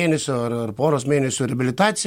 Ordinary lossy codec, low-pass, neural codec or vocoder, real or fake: MP3, 64 kbps; 19.8 kHz; none; real